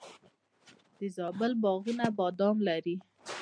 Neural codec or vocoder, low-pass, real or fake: none; 9.9 kHz; real